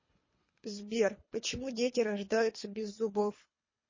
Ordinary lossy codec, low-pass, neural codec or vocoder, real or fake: MP3, 32 kbps; 7.2 kHz; codec, 24 kHz, 3 kbps, HILCodec; fake